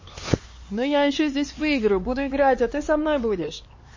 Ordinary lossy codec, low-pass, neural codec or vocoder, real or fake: MP3, 32 kbps; 7.2 kHz; codec, 16 kHz, 4 kbps, X-Codec, HuBERT features, trained on LibriSpeech; fake